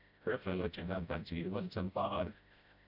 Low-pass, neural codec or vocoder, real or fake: 5.4 kHz; codec, 16 kHz, 0.5 kbps, FreqCodec, smaller model; fake